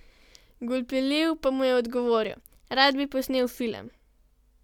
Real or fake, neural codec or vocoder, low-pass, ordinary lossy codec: real; none; 19.8 kHz; none